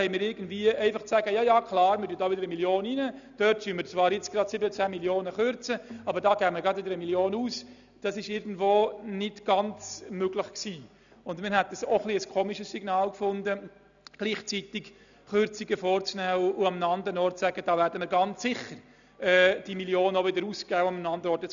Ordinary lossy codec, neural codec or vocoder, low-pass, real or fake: none; none; 7.2 kHz; real